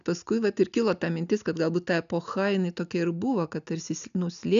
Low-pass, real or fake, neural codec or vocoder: 7.2 kHz; real; none